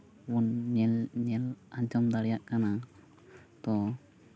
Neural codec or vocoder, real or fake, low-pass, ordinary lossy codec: none; real; none; none